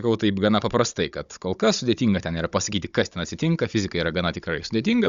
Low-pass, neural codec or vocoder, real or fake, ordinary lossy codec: 7.2 kHz; codec, 16 kHz, 16 kbps, FunCodec, trained on Chinese and English, 50 frames a second; fake; Opus, 64 kbps